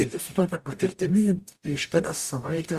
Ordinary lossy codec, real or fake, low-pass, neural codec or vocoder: Opus, 64 kbps; fake; 14.4 kHz; codec, 44.1 kHz, 0.9 kbps, DAC